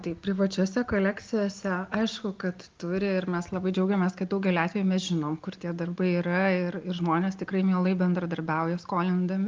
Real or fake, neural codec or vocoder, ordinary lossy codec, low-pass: real; none; Opus, 32 kbps; 7.2 kHz